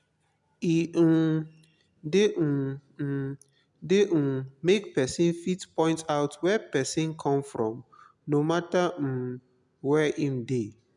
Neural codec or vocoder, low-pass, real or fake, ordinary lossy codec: none; 10.8 kHz; real; none